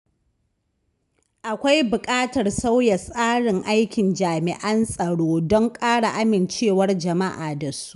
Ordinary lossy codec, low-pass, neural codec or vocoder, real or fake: none; 10.8 kHz; none; real